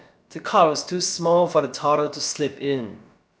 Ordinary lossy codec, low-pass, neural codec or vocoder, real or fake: none; none; codec, 16 kHz, about 1 kbps, DyCAST, with the encoder's durations; fake